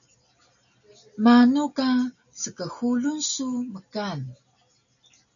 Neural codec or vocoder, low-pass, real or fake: none; 7.2 kHz; real